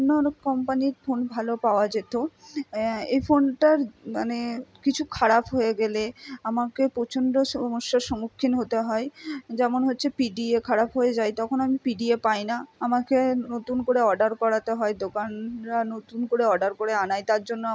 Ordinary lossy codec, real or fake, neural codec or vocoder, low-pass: none; real; none; none